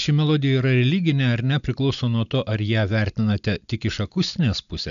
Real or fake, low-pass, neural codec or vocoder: real; 7.2 kHz; none